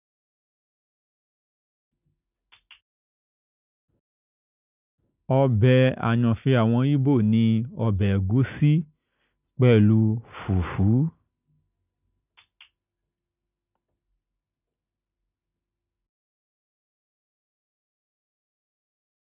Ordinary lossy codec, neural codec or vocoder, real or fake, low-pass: none; none; real; 3.6 kHz